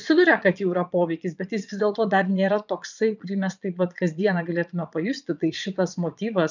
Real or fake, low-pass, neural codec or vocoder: fake; 7.2 kHz; vocoder, 22.05 kHz, 80 mel bands, Vocos